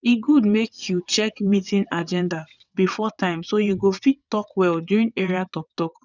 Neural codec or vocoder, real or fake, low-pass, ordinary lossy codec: vocoder, 22.05 kHz, 80 mel bands, WaveNeXt; fake; 7.2 kHz; none